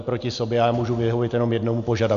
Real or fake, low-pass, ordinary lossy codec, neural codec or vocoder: real; 7.2 kHz; MP3, 96 kbps; none